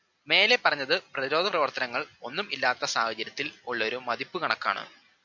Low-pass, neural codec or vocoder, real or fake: 7.2 kHz; none; real